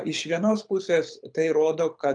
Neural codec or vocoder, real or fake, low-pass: codec, 24 kHz, 6 kbps, HILCodec; fake; 9.9 kHz